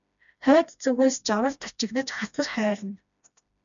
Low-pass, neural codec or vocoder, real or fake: 7.2 kHz; codec, 16 kHz, 1 kbps, FreqCodec, smaller model; fake